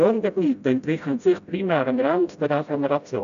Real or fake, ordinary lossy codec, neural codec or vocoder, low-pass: fake; none; codec, 16 kHz, 0.5 kbps, FreqCodec, smaller model; 7.2 kHz